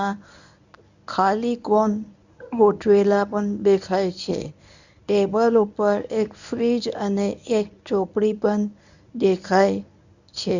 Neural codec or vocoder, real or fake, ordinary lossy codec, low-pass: codec, 24 kHz, 0.9 kbps, WavTokenizer, medium speech release version 1; fake; none; 7.2 kHz